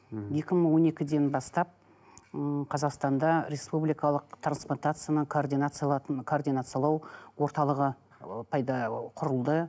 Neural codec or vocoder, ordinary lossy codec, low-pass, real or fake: none; none; none; real